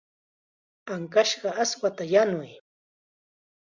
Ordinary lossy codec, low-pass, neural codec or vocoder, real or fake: Opus, 64 kbps; 7.2 kHz; none; real